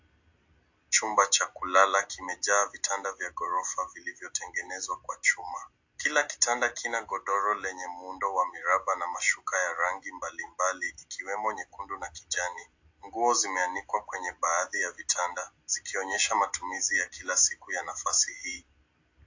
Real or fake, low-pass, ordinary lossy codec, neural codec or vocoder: real; 7.2 kHz; AAC, 48 kbps; none